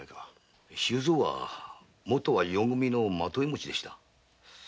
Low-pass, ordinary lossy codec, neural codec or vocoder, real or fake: none; none; none; real